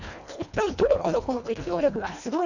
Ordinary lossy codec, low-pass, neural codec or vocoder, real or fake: none; 7.2 kHz; codec, 24 kHz, 1.5 kbps, HILCodec; fake